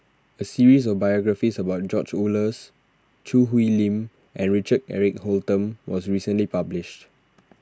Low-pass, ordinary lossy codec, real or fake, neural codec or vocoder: none; none; real; none